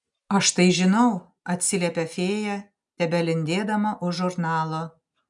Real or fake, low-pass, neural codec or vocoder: real; 10.8 kHz; none